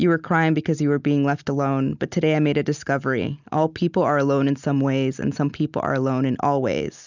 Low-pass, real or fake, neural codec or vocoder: 7.2 kHz; real; none